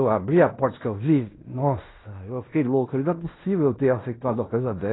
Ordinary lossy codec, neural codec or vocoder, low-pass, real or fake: AAC, 16 kbps; codec, 16 kHz in and 24 kHz out, 0.9 kbps, LongCat-Audio-Codec, fine tuned four codebook decoder; 7.2 kHz; fake